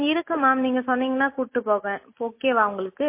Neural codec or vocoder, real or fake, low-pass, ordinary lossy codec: none; real; 3.6 kHz; MP3, 24 kbps